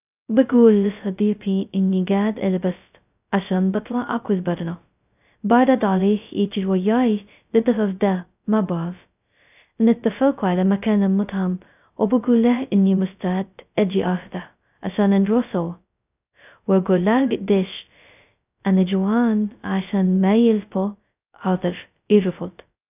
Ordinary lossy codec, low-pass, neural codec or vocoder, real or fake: none; 3.6 kHz; codec, 16 kHz, 0.2 kbps, FocalCodec; fake